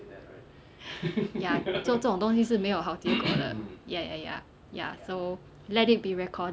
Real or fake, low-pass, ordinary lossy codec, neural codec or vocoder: real; none; none; none